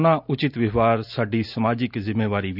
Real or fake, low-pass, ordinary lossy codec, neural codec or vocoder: real; 5.4 kHz; none; none